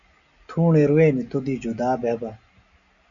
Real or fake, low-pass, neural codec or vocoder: real; 7.2 kHz; none